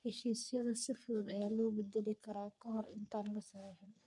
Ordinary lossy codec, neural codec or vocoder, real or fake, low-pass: none; codec, 44.1 kHz, 3.4 kbps, Pupu-Codec; fake; 10.8 kHz